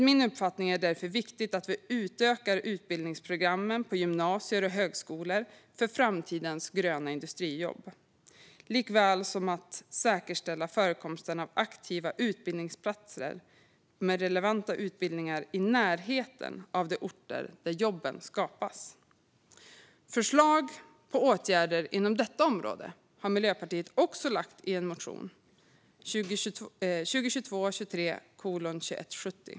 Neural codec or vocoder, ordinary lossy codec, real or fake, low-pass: none; none; real; none